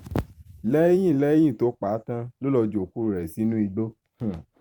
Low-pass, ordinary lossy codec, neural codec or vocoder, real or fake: 19.8 kHz; none; vocoder, 44.1 kHz, 128 mel bands every 512 samples, BigVGAN v2; fake